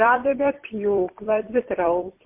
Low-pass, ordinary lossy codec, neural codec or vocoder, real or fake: 3.6 kHz; MP3, 32 kbps; vocoder, 44.1 kHz, 128 mel bands every 256 samples, BigVGAN v2; fake